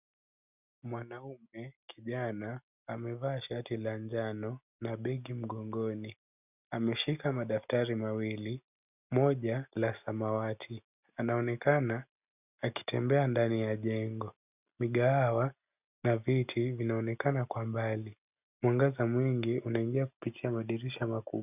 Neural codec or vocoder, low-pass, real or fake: none; 3.6 kHz; real